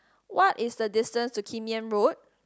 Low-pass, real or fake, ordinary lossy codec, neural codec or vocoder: none; real; none; none